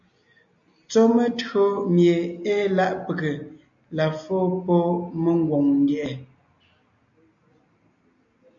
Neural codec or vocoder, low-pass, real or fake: none; 7.2 kHz; real